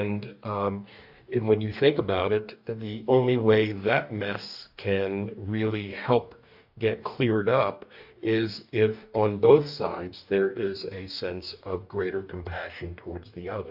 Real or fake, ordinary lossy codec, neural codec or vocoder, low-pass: fake; AAC, 48 kbps; codec, 44.1 kHz, 2.6 kbps, DAC; 5.4 kHz